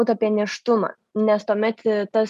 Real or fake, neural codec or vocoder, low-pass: real; none; 14.4 kHz